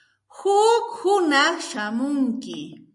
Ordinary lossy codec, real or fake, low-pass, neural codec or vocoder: MP3, 64 kbps; real; 10.8 kHz; none